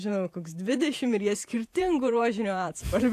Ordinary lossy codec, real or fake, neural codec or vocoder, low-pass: AAC, 64 kbps; fake; vocoder, 48 kHz, 128 mel bands, Vocos; 14.4 kHz